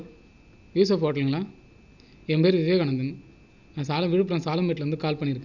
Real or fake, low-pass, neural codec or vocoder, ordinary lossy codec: real; 7.2 kHz; none; none